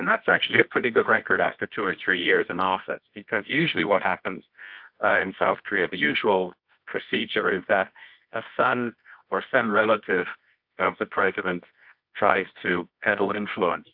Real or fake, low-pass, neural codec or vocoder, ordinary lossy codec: fake; 5.4 kHz; codec, 24 kHz, 0.9 kbps, WavTokenizer, medium music audio release; AAC, 48 kbps